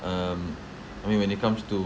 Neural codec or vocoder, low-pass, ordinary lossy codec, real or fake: none; none; none; real